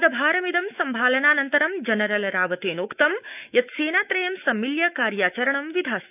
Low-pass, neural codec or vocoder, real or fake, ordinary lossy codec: 3.6 kHz; autoencoder, 48 kHz, 128 numbers a frame, DAC-VAE, trained on Japanese speech; fake; none